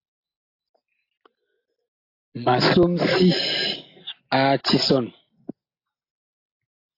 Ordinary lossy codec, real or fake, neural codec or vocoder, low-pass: AAC, 32 kbps; real; none; 5.4 kHz